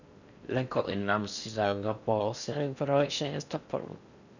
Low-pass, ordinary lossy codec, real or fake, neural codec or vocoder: 7.2 kHz; none; fake; codec, 16 kHz in and 24 kHz out, 0.6 kbps, FocalCodec, streaming, 4096 codes